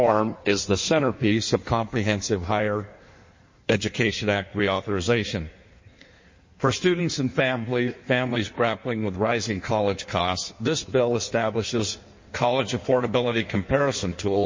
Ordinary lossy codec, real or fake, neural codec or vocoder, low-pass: MP3, 32 kbps; fake; codec, 16 kHz in and 24 kHz out, 1.1 kbps, FireRedTTS-2 codec; 7.2 kHz